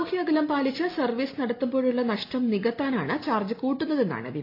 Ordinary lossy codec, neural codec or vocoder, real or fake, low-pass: AAC, 32 kbps; none; real; 5.4 kHz